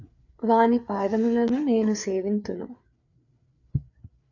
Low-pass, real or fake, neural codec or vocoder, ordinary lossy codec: 7.2 kHz; fake; codec, 16 kHz, 4 kbps, FreqCodec, larger model; AAC, 48 kbps